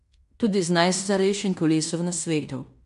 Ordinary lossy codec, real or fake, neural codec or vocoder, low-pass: none; fake; codec, 16 kHz in and 24 kHz out, 0.9 kbps, LongCat-Audio-Codec, fine tuned four codebook decoder; 10.8 kHz